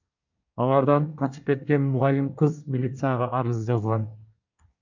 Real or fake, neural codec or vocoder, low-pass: fake; codec, 24 kHz, 1 kbps, SNAC; 7.2 kHz